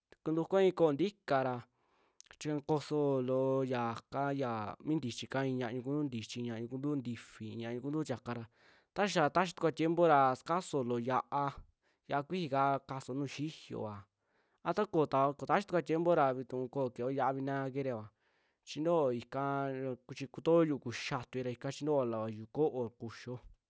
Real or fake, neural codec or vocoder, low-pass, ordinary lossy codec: real; none; none; none